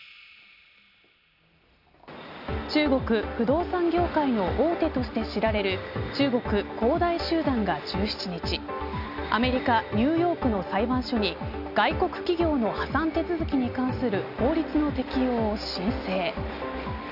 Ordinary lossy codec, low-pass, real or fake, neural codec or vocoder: none; 5.4 kHz; real; none